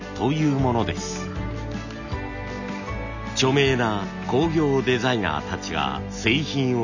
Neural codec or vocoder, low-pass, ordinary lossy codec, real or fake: none; 7.2 kHz; none; real